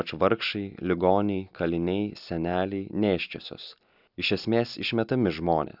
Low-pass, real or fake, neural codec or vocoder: 5.4 kHz; real; none